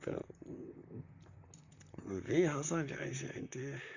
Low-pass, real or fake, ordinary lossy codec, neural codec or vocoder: 7.2 kHz; fake; none; vocoder, 44.1 kHz, 128 mel bands, Pupu-Vocoder